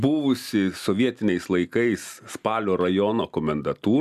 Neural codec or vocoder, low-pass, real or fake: none; 14.4 kHz; real